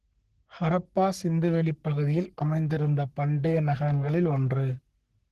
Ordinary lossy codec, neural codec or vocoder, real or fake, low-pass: Opus, 16 kbps; codec, 44.1 kHz, 3.4 kbps, Pupu-Codec; fake; 14.4 kHz